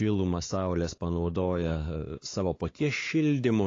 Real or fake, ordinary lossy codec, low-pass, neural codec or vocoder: fake; AAC, 32 kbps; 7.2 kHz; codec, 16 kHz, 4 kbps, X-Codec, WavLM features, trained on Multilingual LibriSpeech